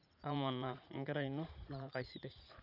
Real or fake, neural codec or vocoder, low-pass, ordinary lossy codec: fake; vocoder, 44.1 kHz, 80 mel bands, Vocos; 5.4 kHz; none